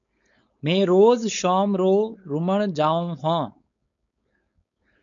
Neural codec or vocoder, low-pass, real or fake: codec, 16 kHz, 4.8 kbps, FACodec; 7.2 kHz; fake